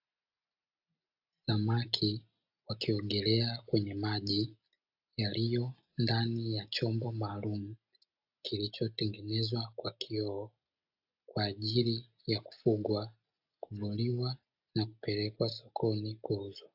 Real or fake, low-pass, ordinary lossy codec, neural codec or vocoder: real; 5.4 kHz; AAC, 48 kbps; none